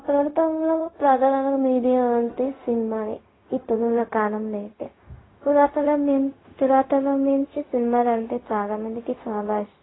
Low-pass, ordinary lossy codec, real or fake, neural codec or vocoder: 7.2 kHz; AAC, 16 kbps; fake; codec, 16 kHz, 0.4 kbps, LongCat-Audio-Codec